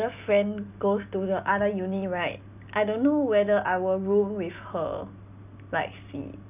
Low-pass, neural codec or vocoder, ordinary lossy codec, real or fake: 3.6 kHz; none; none; real